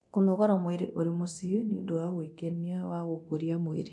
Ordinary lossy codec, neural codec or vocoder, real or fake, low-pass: none; codec, 24 kHz, 0.9 kbps, DualCodec; fake; 10.8 kHz